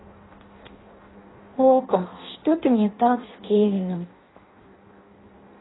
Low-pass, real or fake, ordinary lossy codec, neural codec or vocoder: 7.2 kHz; fake; AAC, 16 kbps; codec, 16 kHz in and 24 kHz out, 0.6 kbps, FireRedTTS-2 codec